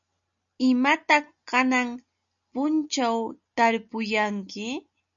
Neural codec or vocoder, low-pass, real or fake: none; 7.2 kHz; real